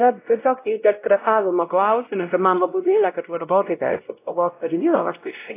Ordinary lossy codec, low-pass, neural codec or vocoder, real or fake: AAC, 24 kbps; 3.6 kHz; codec, 16 kHz, 0.5 kbps, X-Codec, WavLM features, trained on Multilingual LibriSpeech; fake